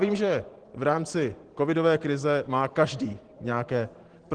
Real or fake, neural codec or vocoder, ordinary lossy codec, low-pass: fake; vocoder, 44.1 kHz, 128 mel bands every 512 samples, BigVGAN v2; Opus, 16 kbps; 9.9 kHz